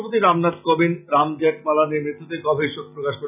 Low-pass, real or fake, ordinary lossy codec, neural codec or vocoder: 3.6 kHz; real; none; none